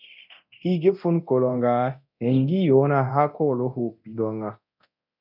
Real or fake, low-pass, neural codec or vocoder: fake; 5.4 kHz; codec, 24 kHz, 0.9 kbps, DualCodec